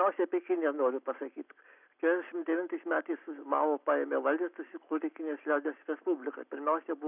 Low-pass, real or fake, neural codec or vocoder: 3.6 kHz; real; none